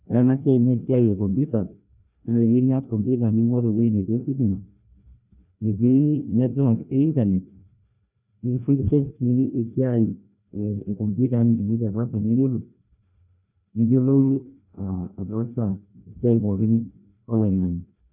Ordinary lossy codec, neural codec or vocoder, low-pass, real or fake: none; codec, 16 kHz, 1 kbps, FreqCodec, larger model; 3.6 kHz; fake